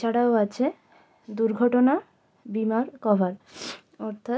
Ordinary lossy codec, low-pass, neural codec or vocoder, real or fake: none; none; none; real